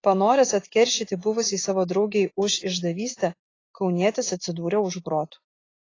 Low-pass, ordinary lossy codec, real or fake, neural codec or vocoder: 7.2 kHz; AAC, 32 kbps; real; none